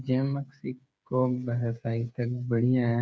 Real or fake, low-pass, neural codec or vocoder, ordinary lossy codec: fake; none; codec, 16 kHz, 8 kbps, FreqCodec, smaller model; none